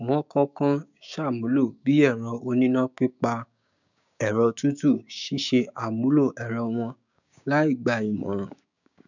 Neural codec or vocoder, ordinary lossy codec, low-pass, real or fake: codec, 16 kHz, 6 kbps, DAC; none; 7.2 kHz; fake